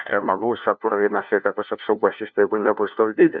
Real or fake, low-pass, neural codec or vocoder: fake; 7.2 kHz; codec, 16 kHz, 1 kbps, FunCodec, trained on LibriTTS, 50 frames a second